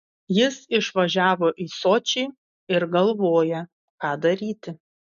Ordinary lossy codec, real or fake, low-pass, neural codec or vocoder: AAC, 96 kbps; real; 7.2 kHz; none